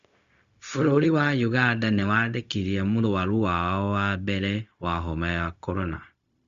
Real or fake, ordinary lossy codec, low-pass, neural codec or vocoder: fake; Opus, 64 kbps; 7.2 kHz; codec, 16 kHz, 0.4 kbps, LongCat-Audio-Codec